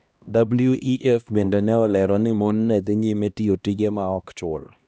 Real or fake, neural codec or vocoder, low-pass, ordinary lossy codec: fake; codec, 16 kHz, 1 kbps, X-Codec, HuBERT features, trained on LibriSpeech; none; none